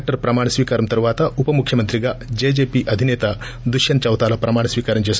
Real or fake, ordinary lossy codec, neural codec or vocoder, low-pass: real; none; none; none